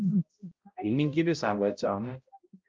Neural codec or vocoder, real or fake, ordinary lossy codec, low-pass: codec, 16 kHz, 0.5 kbps, X-Codec, HuBERT features, trained on balanced general audio; fake; Opus, 24 kbps; 7.2 kHz